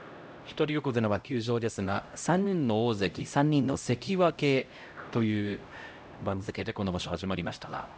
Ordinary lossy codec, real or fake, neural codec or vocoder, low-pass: none; fake; codec, 16 kHz, 0.5 kbps, X-Codec, HuBERT features, trained on LibriSpeech; none